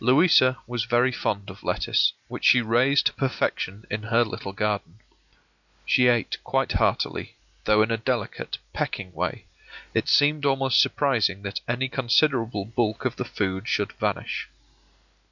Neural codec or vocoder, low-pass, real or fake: none; 7.2 kHz; real